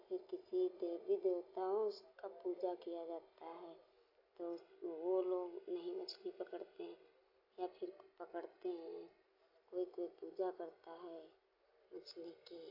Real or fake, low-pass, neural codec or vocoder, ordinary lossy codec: real; 5.4 kHz; none; AAC, 32 kbps